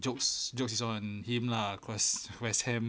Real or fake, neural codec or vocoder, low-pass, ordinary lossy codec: real; none; none; none